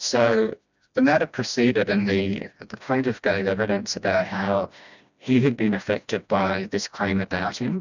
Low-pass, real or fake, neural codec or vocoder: 7.2 kHz; fake; codec, 16 kHz, 1 kbps, FreqCodec, smaller model